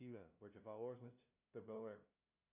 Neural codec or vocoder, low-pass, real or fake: codec, 16 kHz, 0.5 kbps, FunCodec, trained on LibriTTS, 25 frames a second; 3.6 kHz; fake